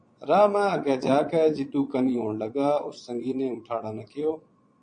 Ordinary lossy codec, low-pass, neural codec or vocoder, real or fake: MP3, 48 kbps; 9.9 kHz; vocoder, 22.05 kHz, 80 mel bands, Vocos; fake